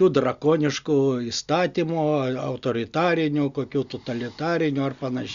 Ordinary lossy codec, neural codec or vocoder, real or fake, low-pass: Opus, 64 kbps; none; real; 7.2 kHz